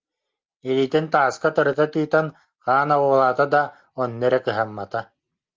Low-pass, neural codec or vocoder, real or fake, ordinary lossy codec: 7.2 kHz; none; real; Opus, 32 kbps